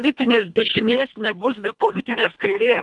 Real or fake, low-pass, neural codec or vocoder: fake; 10.8 kHz; codec, 24 kHz, 1.5 kbps, HILCodec